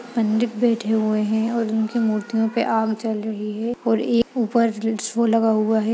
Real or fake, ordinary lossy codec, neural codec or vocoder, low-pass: real; none; none; none